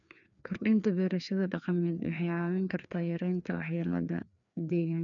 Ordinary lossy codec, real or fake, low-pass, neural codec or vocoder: none; fake; 7.2 kHz; codec, 16 kHz, 2 kbps, FreqCodec, larger model